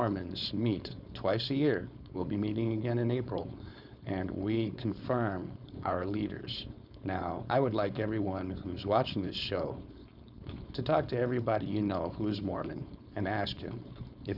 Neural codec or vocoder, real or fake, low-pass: codec, 16 kHz, 4.8 kbps, FACodec; fake; 5.4 kHz